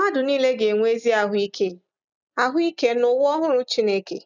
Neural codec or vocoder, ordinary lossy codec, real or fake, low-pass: none; none; real; 7.2 kHz